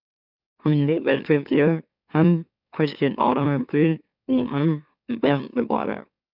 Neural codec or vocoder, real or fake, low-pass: autoencoder, 44.1 kHz, a latent of 192 numbers a frame, MeloTTS; fake; 5.4 kHz